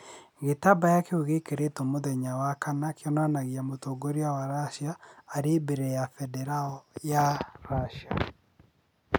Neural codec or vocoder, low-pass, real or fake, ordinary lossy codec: none; none; real; none